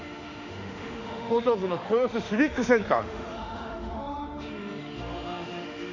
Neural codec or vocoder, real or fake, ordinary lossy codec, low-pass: autoencoder, 48 kHz, 32 numbers a frame, DAC-VAE, trained on Japanese speech; fake; none; 7.2 kHz